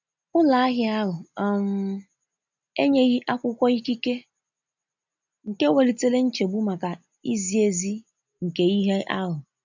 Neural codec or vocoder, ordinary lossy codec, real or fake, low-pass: none; none; real; 7.2 kHz